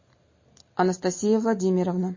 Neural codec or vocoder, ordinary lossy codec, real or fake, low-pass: none; MP3, 32 kbps; real; 7.2 kHz